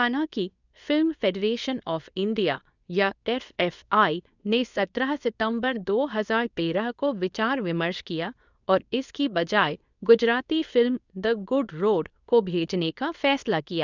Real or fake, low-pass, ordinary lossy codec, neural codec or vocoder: fake; 7.2 kHz; none; codec, 24 kHz, 0.9 kbps, WavTokenizer, medium speech release version 2